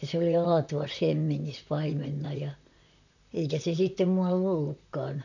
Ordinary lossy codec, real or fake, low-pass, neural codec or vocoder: none; fake; 7.2 kHz; vocoder, 44.1 kHz, 128 mel bands, Pupu-Vocoder